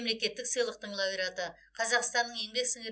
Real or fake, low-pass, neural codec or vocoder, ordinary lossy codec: real; none; none; none